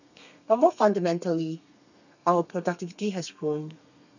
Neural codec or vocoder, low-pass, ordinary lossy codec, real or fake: codec, 32 kHz, 1.9 kbps, SNAC; 7.2 kHz; none; fake